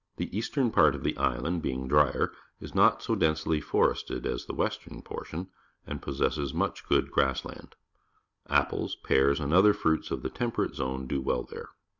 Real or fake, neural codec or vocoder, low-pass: real; none; 7.2 kHz